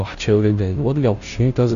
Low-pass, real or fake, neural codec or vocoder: 7.2 kHz; fake; codec, 16 kHz, 0.5 kbps, FunCodec, trained on Chinese and English, 25 frames a second